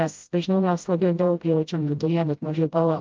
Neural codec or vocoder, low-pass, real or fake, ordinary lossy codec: codec, 16 kHz, 0.5 kbps, FreqCodec, smaller model; 7.2 kHz; fake; Opus, 24 kbps